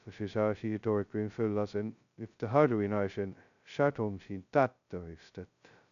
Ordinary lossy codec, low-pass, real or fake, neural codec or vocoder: none; 7.2 kHz; fake; codec, 16 kHz, 0.2 kbps, FocalCodec